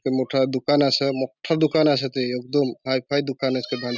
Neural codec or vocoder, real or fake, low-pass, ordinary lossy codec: none; real; 7.2 kHz; none